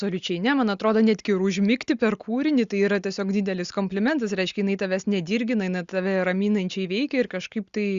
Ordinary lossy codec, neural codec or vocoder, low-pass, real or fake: Opus, 64 kbps; none; 7.2 kHz; real